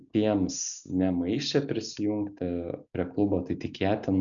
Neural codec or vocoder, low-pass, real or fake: none; 7.2 kHz; real